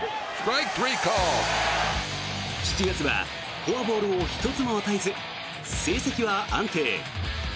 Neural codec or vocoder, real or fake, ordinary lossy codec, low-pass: none; real; none; none